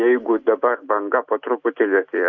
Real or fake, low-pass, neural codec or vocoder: real; 7.2 kHz; none